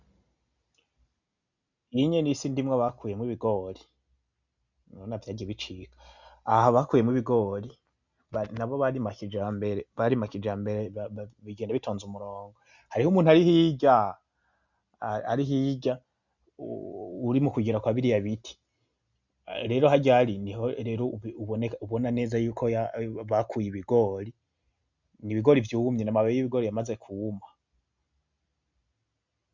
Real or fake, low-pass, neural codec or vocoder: real; 7.2 kHz; none